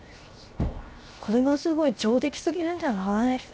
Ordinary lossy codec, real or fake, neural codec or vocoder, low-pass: none; fake; codec, 16 kHz, 0.7 kbps, FocalCodec; none